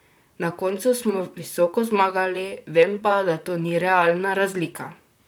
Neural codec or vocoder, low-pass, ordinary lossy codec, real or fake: vocoder, 44.1 kHz, 128 mel bands, Pupu-Vocoder; none; none; fake